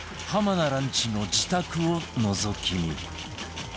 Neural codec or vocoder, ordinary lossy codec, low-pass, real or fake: none; none; none; real